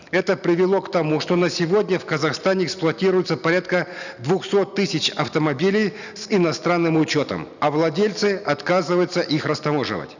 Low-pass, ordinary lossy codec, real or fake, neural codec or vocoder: 7.2 kHz; none; real; none